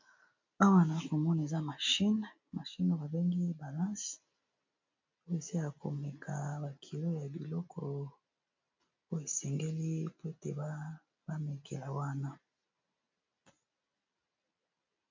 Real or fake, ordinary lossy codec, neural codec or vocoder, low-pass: real; MP3, 48 kbps; none; 7.2 kHz